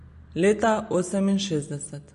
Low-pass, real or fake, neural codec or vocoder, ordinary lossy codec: 14.4 kHz; real; none; MP3, 48 kbps